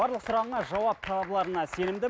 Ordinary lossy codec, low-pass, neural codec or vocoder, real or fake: none; none; none; real